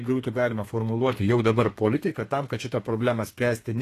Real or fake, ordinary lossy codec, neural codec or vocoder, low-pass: fake; AAC, 48 kbps; codec, 32 kHz, 1.9 kbps, SNAC; 14.4 kHz